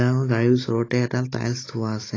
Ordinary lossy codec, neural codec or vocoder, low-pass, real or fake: AAC, 32 kbps; none; 7.2 kHz; real